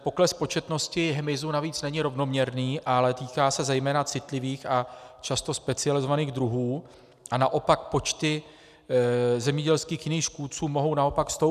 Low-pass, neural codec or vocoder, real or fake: 14.4 kHz; none; real